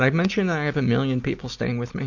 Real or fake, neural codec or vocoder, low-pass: real; none; 7.2 kHz